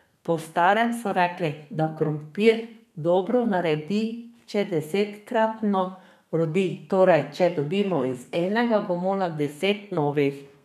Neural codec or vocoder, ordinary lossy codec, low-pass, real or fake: codec, 32 kHz, 1.9 kbps, SNAC; none; 14.4 kHz; fake